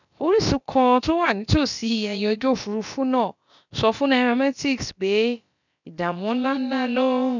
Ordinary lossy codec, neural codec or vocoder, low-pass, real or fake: none; codec, 16 kHz, about 1 kbps, DyCAST, with the encoder's durations; 7.2 kHz; fake